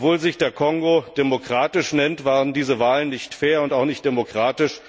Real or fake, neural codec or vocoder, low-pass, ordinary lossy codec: real; none; none; none